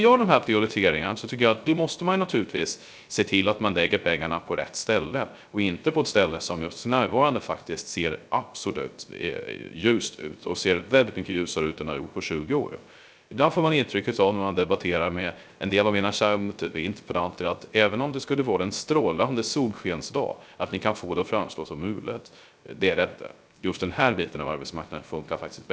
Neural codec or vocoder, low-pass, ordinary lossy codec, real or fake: codec, 16 kHz, 0.3 kbps, FocalCodec; none; none; fake